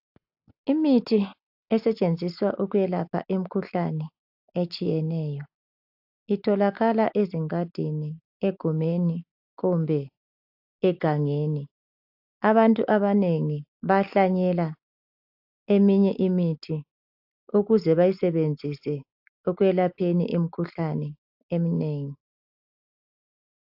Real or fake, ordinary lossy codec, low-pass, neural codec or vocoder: real; AAC, 48 kbps; 5.4 kHz; none